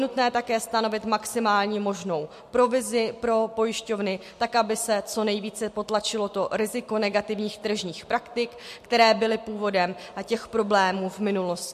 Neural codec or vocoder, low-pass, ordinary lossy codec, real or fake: none; 14.4 kHz; MP3, 64 kbps; real